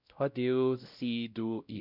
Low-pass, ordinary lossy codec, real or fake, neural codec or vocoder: 5.4 kHz; none; fake; codec, 16 kHz, 0.5 kbps, X-Codec, WavLM features, trained on Multilingual LibriSpeech